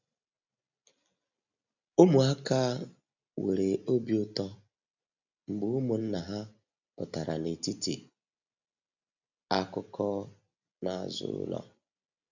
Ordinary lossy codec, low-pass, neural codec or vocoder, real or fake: none; 7.2 kHz; none; real